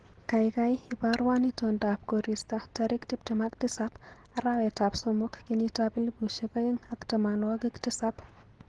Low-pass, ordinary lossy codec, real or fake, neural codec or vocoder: 10.8 kHz; Opus, 16 kbps; real; none